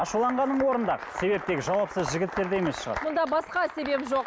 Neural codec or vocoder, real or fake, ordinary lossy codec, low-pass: none; real; none; none